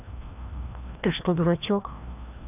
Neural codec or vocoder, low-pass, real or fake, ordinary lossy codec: codec, 16 kHz, 1 kbps, FreqCodec, larger model; 3.6 kHz; fake; none